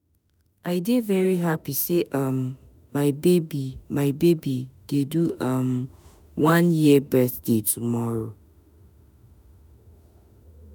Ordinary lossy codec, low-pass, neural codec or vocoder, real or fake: none; none; autoencoder, 48 kHz, 32 numbers a frame, DAC-VAE, trained on Japanese speech; fake